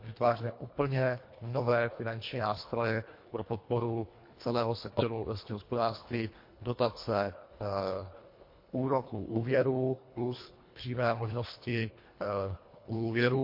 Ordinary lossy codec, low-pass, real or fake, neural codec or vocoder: MP3, 32 kbps; 5.4 kHz; fake; codec, 24 kHz, 1.5 kbps, HILCodec